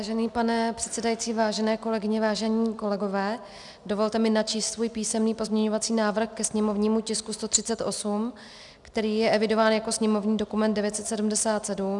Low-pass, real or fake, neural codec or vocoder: 10.8 kHz; real; none